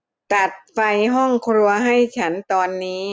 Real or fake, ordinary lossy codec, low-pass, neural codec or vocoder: real; none; none; none